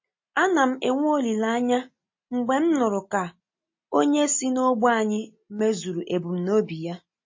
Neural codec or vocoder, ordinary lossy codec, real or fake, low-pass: none; MP3, 32 kbps; real; 7.2 kHz